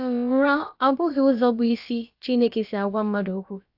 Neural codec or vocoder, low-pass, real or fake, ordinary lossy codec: codec, 16 kHz, about 1 kbps, DyCAST, with the encoder's durations; 5.4 kHz; fake; AAC, 48 kbps